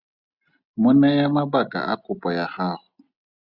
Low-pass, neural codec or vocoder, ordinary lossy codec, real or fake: 5.4 kHz; none; Opus, 64 kbps; real